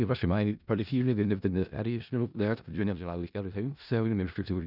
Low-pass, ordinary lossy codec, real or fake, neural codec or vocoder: 5.4 kHz; none; fake; codec, 16 kHz in and 24 kHz out, 0.4 kbps, LongCat-Audio-Codec, four codebook decoder